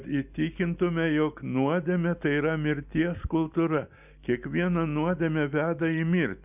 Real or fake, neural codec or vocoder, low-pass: real; none; 3.6 kHz